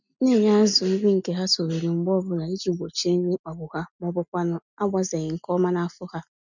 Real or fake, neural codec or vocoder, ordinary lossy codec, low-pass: real; none; none; 7.2 kHz